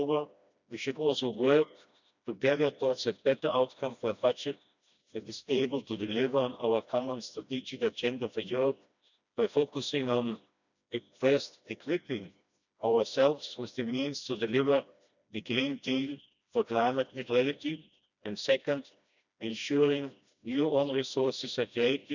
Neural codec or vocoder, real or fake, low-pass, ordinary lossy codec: codec, 16 kHz, 1 kbps, FreqCodec, smaller model; fake; 7.2 kHz; none